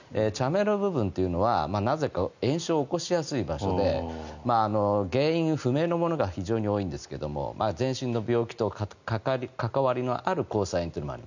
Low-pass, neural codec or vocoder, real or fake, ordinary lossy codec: 7.2 kHz; none; real; none